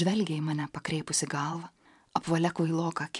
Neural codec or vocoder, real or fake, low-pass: vocoder, 44.1 kHz, 128 mel bands every 512 samples, BigVGAN v2; fake; 10.8 kHz